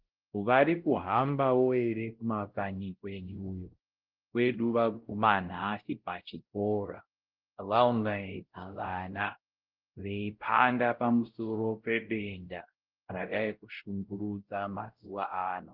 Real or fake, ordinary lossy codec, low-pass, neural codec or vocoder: fake; Opus, 16 kbps; 5.4 kHz; codec, 16 kHz, 0.5 kbps, X-Codec, WavLM features, trained on Multilingual LibriSpeech